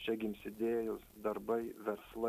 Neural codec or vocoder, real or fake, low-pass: none; real; 14.4 kHz